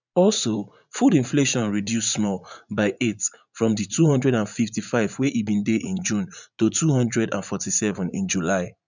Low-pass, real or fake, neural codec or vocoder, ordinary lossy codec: 7.2 kHz; fake; vocoder, 44.1 kHz, 80 mel bands, Vocos; none